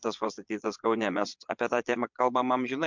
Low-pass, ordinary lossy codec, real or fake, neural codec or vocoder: 7.2 kHz; MP3, 64 kbps; fake; vocoder, 22.05 kHz, 80 mel bands, Vocos